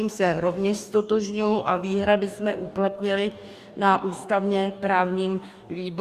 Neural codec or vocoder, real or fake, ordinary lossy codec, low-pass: codec, 44.1 kHz, 2.6 kbps, DAC; fake; Opus, 64 kbps; 14.4 kHz